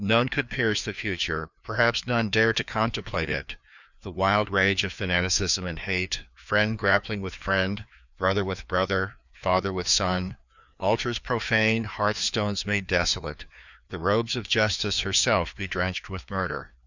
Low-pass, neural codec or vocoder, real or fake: 7.2 kHz; codec, 16 kHz, 2 kbps, FreqCodec, larger model; fake